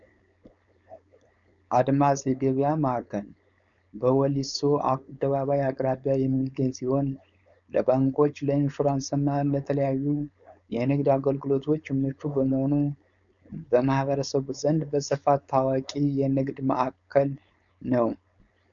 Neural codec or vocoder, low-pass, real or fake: codec, 16 kHz, 4.8 kbps, FACodec; 7.2 kHz; fake